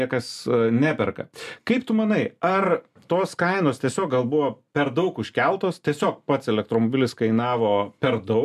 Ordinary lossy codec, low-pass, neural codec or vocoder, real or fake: MP3, 96 kbps; 14.4 kHz; none; real